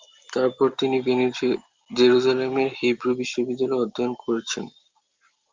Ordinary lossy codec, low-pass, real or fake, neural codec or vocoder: Opus, 24 kbps; 7.2 kHz; real; none